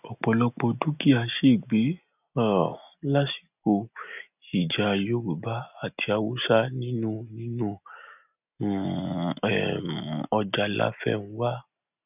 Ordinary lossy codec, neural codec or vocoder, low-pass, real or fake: none; none; 3.6 kHz; real